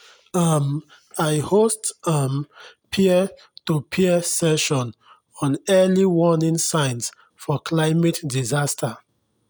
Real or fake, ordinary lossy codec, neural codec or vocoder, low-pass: real; none; none; none